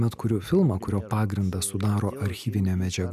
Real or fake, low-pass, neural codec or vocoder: real; 14.4 kHz; none